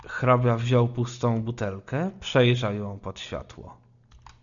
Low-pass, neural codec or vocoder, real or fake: 7.2 kHz; none; real